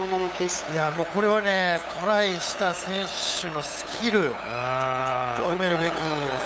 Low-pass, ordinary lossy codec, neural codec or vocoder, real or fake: none; none; codec, 16 kHz, 8 kbps, FunCodec, trained on LibriTTS, 25 frames a second; fake